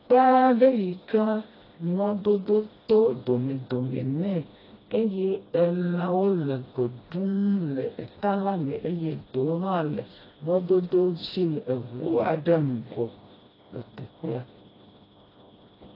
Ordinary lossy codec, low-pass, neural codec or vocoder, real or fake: AAC, 24 kbps; 5.4 kHz; codec, 16 kHz, 1 kbps, FreqCodec, smaller model; fake